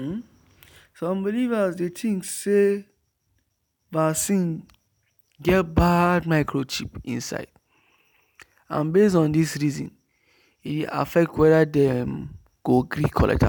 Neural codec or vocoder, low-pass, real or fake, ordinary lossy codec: none; none; real; none